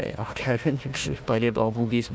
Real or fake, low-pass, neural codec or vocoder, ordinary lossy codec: fake; none; codec, 16 kHz, 1 kbps, FunCodec, trained on Chinese and English, 50 frames a second; none